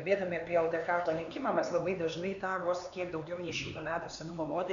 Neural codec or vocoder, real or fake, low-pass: codec, 16 kHz, 4 kbps, X-Codec, HuBERT features, trained on LibriSpeech; fake; 7.2 kHz